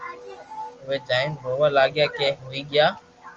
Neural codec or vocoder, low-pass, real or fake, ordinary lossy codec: none; 7.2 kHz; real; Opus, 24 kbps